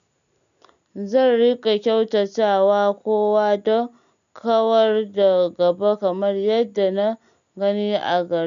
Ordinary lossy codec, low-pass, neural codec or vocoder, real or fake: none; 7.2 kHz; none; real